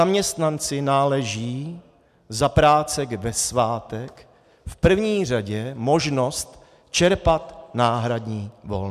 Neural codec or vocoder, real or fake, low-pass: none; real; 14.4 kHz